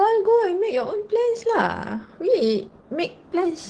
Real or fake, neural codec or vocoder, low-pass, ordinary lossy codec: fake; codec, 44.1 kHz, 7.8 kbps, DAC; 9.9 kHz; Opus, 16 kbps